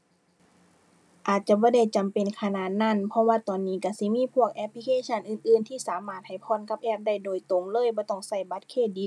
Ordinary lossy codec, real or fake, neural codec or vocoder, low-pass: none; real; none; none